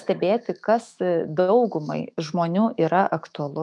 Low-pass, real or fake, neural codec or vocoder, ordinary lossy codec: 10.8 kHz; fake; autoencoder, 48 kHz, 128 numbers a frame, DAC-VAE, trained on Japanese speech; MP3, 96 kbps